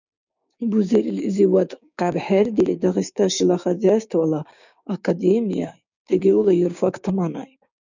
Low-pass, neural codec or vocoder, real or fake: 7.2 kHz; codec, 16 kHz, 6 kbps, DAC; fake